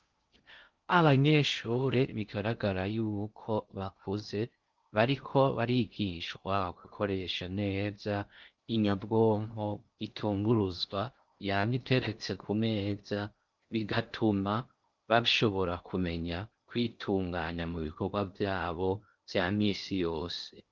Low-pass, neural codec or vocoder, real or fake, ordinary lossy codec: 7.2 kHz; codec, 16 kHz in and 24 kHz out, 0.6 kbps, FocalCodec, streaming, 2048 codes; fake; Opus, 24 kbps